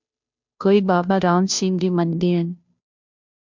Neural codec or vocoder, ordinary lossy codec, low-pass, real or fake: codec, 16 kHz, 0.5 kbps, FunCodec, trained on Chinese and English, 25 frames a second; MP3, 64 kbps; 7.2 kHz; fake